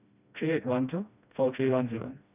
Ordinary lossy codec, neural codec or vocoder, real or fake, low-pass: none; codec, 16 kHz, 1 kbps, FreqCodec, smaller model; fake; 3.6 kHz